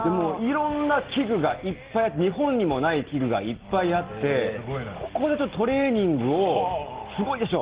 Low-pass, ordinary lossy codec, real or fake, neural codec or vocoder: 3.6 kHz; Opus, 16 kbps; real; none